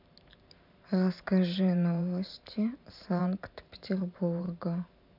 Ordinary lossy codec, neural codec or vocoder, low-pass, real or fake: none; vocoder, 44.1 kHz, 128 mel bands every 512 samples, BigVGAN v2; 5.4 kHz; fake